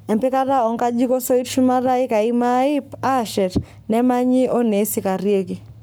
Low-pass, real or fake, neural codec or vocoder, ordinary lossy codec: none; fake; codec, 44.1 kHz, 7.8 kbps, Pupu-Codec; none